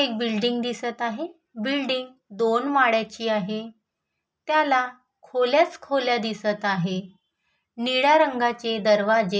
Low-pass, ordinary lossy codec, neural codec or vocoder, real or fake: none; none; none; real